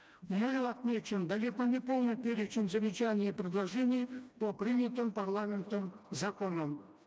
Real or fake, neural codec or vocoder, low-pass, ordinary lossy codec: fake; codec, 16 kHz, 1 kbps, FreqCodec, smaller model; none; none